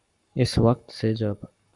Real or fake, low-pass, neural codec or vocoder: fake; 10.8 kHz; codec, 44.1 kHz, 7.8 kbps, Pupu-Codec